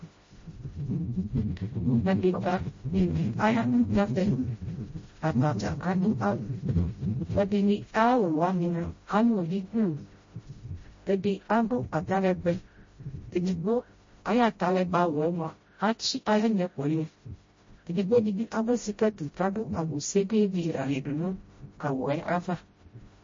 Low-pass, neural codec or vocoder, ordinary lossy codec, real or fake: 7.2 kHz; codec, 16 kHz, 0.5 kbps, FreqCodec, smaller model; MP3, 32 kbps; fake